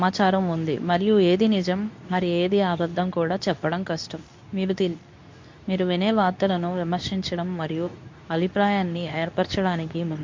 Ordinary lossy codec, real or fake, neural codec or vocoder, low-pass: MP3, 48 kbps; fake; codec, 24 kHz, 0.9 kbps, WavTokenizer, medium speech release version 2; 7.2 kHz